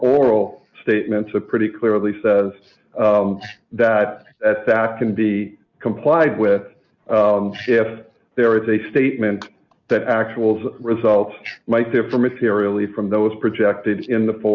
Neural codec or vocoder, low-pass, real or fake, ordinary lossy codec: none; 7.2 kHz; real; Opus, 64 kbps